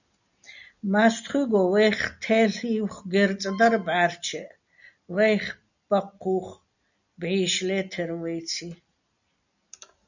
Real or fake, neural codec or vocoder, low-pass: real; none; 7.2 kHz